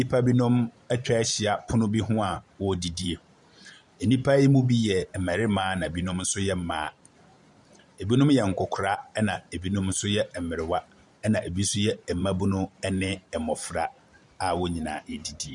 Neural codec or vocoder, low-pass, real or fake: vocoder, 44.1 kHz, 128 mel bands every 512 samples, BigVGAN v2; 10.8 kHz; fake